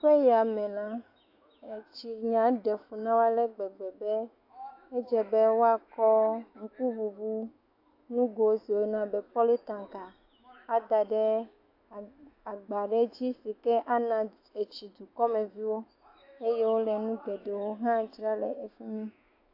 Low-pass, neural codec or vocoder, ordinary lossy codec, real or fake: 5.4 kHz; autoencoder, 48 kHz, 128 numbers a frame, DAC-VAE, trained on Japanese speech; AAC, 32 kbps; fake